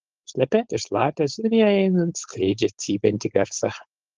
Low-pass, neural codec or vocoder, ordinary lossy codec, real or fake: 7.2 kHz; codec, 16 kHz, 4.8 kbps, FACodec; Opus, 16 kbps; fake